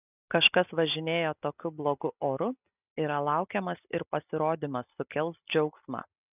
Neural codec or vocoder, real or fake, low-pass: none; real; 3.6 kHz